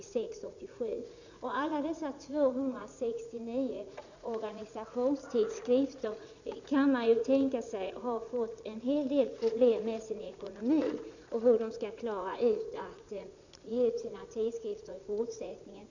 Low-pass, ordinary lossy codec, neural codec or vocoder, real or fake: 7.2 kHz; none; vocoder, 44.1 kHz, 80 mel bands, Vocos; fake